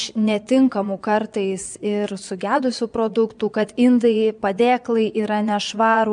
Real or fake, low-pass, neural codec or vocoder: fake; 9.9 kHz; vocoder, 22.05 kHz, 80 mel bands, Vocos